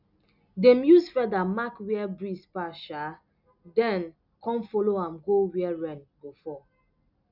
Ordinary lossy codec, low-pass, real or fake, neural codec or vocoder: none; 5.4 kHz; real; none